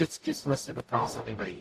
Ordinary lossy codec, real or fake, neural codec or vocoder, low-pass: AAC, 64 kbps; fake; codec, 44.1 kHz, 0.9 kbps, DAC; 14.4 kHz